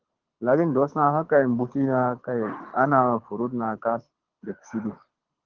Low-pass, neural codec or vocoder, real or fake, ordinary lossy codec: 7.2 kHz; codec, 24 kHz, 6 kbps, HILCodec; fake; Opus, 16 kbps